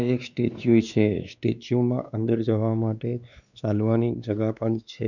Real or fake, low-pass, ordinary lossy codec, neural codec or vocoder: fake; 7.2 kHz; none; codec, 16 kHz, 2 kbps, X-Codec, WavLM features, trained on Multilingual LibriSpeech